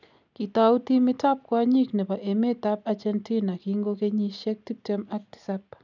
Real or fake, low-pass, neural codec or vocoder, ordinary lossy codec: real; 7.2 kHz; none; none